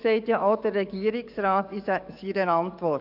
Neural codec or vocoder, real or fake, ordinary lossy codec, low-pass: codec, 44.1 kHz, 7.8 kbps, DAC; fake; none; 5.4 kHz